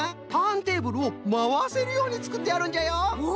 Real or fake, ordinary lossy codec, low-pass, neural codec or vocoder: real; none; none; none